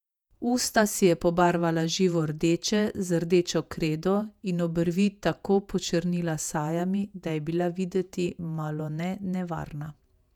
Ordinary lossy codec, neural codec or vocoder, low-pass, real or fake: none; vocoder, 48 kHz, 128 mel bands, Vocos; 19.8 kHz; fake